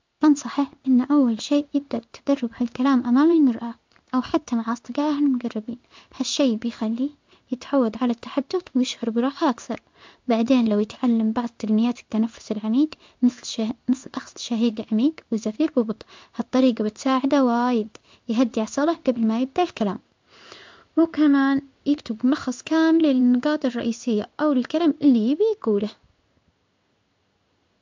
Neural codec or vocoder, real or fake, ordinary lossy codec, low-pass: codec, 16 kHz in and 24 kHz out, 1 kbps, XY-Tokenizer; fake; MP3, 64 kbps; 7.2 kHz